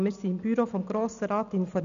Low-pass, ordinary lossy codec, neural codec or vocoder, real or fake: 7.2 kHz; none; none; real